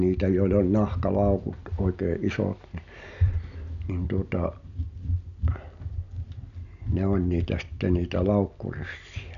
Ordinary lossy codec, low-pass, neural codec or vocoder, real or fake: none; 7.2 kHz; none; real